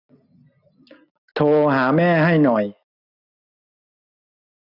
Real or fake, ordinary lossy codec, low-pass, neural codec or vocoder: real; none; 5.4 kHz; none